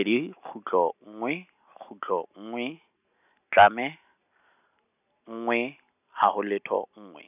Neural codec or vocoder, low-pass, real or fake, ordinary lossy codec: none; 3.6 kHz; real; none